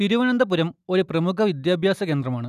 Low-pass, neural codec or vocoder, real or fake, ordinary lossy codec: 14.4 kHz; none; real; none